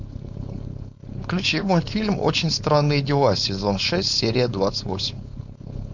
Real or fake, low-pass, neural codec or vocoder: fake; 7.2 kHz; codec, 16 kHz, 4.8 kbps, FACodec